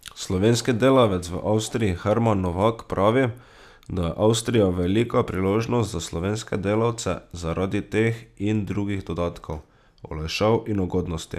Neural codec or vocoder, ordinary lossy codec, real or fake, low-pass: none; none; real; 14.4 kHz